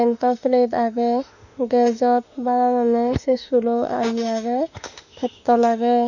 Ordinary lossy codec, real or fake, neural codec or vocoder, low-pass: none; fake; autoencoder, 48 kHz, 32 numbers a frame, DAC-VAE, trained on Japanese speech; 7.2 kHz